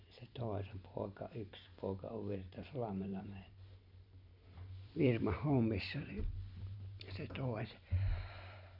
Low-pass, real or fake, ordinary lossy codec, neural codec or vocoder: 5.4 kHz; fake; none; vocoder, 44.1 kHz, 128 mel bands every 512 samples, BigVGAN v2